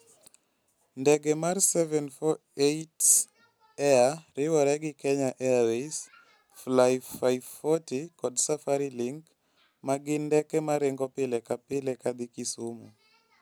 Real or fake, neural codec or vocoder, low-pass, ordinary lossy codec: real; none; none; none